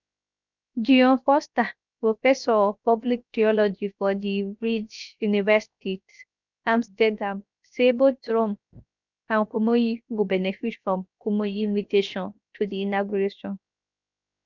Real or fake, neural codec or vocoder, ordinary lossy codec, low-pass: fake; codec, 16 kHz, 0.7 kbps, FocalCodec; none; 7.2 kHz